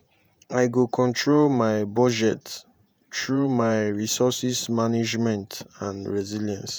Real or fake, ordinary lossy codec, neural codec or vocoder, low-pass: fake; none; vocoder, 48 kHz, 128 mel bands, Vocos; none